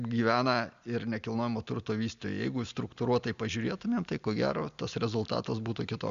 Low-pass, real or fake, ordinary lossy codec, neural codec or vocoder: 7.2 kHz; real; Opus, 64 kbps; none